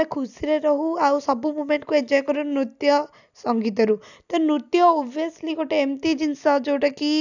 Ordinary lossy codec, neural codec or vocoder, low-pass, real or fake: none; none; 7.2 kHz; real